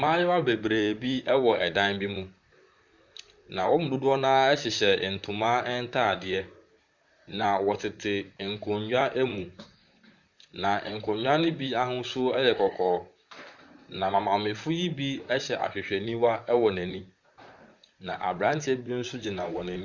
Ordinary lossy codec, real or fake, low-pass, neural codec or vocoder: Opus, 64 kbps; fake; 7.2 kHz; vocoder, 44.1 kHz, 128 mel bands, Pupu-Vocoder